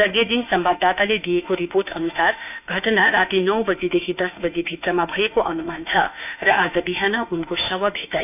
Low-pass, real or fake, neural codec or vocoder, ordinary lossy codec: 3.6 kHz; fake; autoencoder, 48 kHz, 32 numbers a frame, DAC-VAE, trained on Japanese speech; none